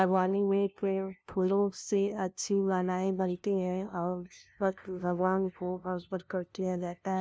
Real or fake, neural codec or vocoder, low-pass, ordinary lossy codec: fake; codec, 16 kHz, 0.5 kbps, FunCodec, trained on LibriTTS, 25 frames a second; none; none